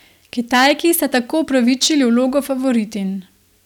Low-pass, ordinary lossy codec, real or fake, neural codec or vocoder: 19.8 kHz; none; real; none